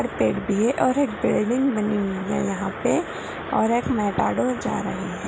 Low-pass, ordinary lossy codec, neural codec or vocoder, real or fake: none; none; none; real